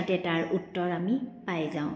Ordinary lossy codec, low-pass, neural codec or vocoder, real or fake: none; none; none; real